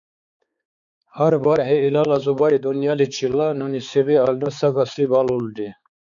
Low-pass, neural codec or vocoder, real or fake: 7.2 kHz; codec, 16 kHz, 4 kbps, X-Codec, HuBERT features, trained on balanced general audio; fake